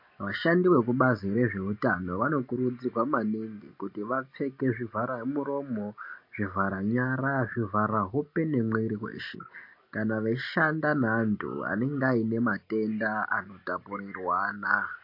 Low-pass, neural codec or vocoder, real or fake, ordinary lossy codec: 5.4 kHz; none; real; MP3, 32 kbps